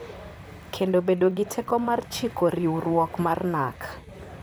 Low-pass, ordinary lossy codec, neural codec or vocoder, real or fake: none; none; vocoder, 44.1 kHz, 128 mel bands, Pupu-Vocoder; fake